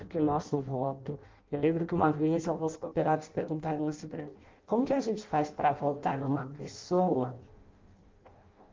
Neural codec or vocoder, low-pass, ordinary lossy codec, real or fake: codec, 16 kHz in and 24 kHz out, 0.6 kbps, FireRedTTS-2 codec; 7.2 kHz; Opus, 32 kbps; fake